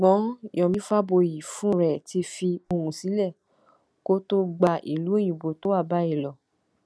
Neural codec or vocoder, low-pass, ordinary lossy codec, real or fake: none; none; none; real